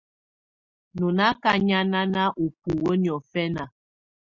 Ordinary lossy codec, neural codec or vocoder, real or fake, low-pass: Opus, 64 kbps; none; real; 7.2 kHz